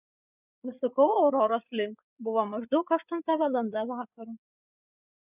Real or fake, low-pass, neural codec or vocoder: fake; 3.6 kHz; codec, 16 kHz, 8 kbps, FreqCodec, larger model